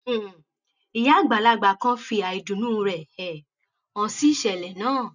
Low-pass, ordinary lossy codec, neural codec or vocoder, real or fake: 7.2 kHz; none; none; real